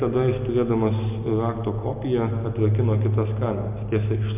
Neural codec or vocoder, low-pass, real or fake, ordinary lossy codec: none; 3.6 kHz; real; MP3, 32 kbps